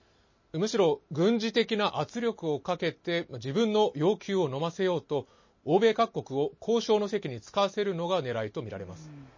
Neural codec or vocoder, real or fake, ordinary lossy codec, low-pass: none; real; MP3, 32 kbps; 7.2 kHz